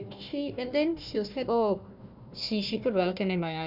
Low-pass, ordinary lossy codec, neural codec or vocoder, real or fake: 5.4 kHz; none; codec, 16 kHz, 1 kbps, FunCodec, trained on Chinese and English, 50 frames a second; fake